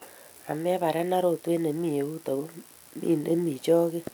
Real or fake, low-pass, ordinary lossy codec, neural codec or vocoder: real; none; none; none